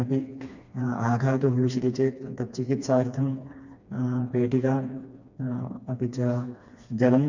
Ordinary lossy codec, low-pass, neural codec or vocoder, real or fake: none; 7.2 kHz; codec, 16 kHz, 2 kbps, FreqCodec, smaller model; fake